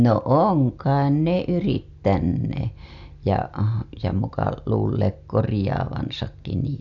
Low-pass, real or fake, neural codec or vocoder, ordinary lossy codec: 7.2 kHz; real; none; none